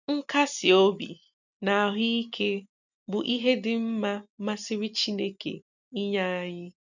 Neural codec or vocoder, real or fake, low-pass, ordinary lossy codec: none; real; 7.2 kHz; none